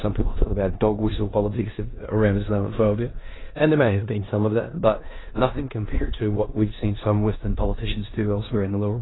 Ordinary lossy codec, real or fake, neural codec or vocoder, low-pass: AAC, 16 kbps; fake; codec, 16 kHz in and 24 kHz out, 0.9 kbps, LongCat-Audio-Codec, four codebook decoder; 7.2 kHz